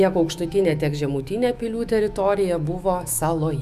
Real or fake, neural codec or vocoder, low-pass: fake; autoencoder, 48 kHz, 128 numbers a frame, DAC-VAE, trained on Japanese speech; 14.4 kHz